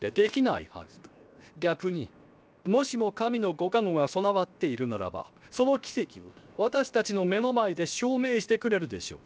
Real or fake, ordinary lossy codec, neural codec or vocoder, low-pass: fake; none; codec, 16 kHz, 0.7 kbps, FocalCodec; none